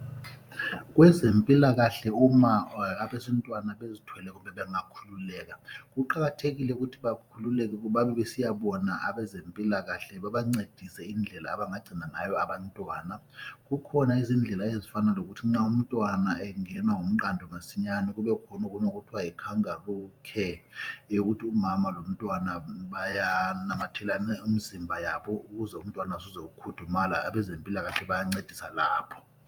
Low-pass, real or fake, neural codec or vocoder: 19.8 kHz; fake; vocoder, 44.1 kHz, 128 mel bands every 512 samples, BigVGAN v2